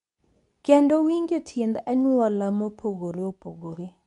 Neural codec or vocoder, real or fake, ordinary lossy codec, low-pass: codec, 24 kHz, 0.9 kbps, WavTokenizer, medium speech release version 2; fake; none; 10.8 kHz